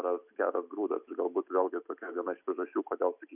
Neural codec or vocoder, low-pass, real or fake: none; 3.6 kHz; real